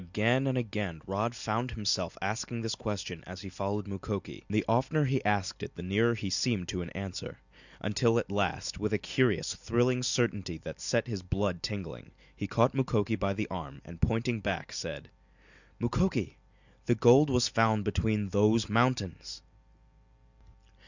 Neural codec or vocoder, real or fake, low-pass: none; real; 7.2 kHz